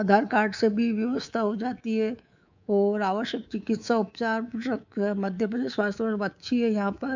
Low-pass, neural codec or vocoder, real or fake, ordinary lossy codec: 7.2 kHz; codec, 24 kHz, 3.1 kbps, DualCodec; fake; none